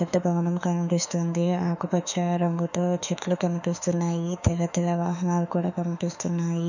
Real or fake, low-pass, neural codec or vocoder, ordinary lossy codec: fake; 7.2 kHz; autoencoder, 48 kHz, 32 numbers a frame, DAC-VAE, trained on Japanese speech; none